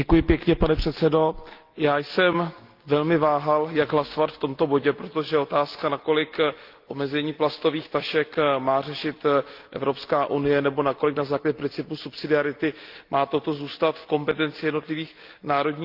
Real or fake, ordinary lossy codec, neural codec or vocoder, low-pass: real; Opus, 32 kbps; none; 5.4 kHz